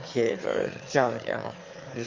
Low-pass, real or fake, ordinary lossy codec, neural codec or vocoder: 7.2 kHz; fake; Opus, 32 kbps; autoencoder, 22.05 kHz, a latent of 192 numbers a frame, VITS, trained on one speaker